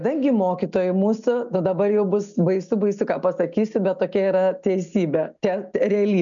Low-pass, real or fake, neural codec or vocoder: 7.2 kHz; real; none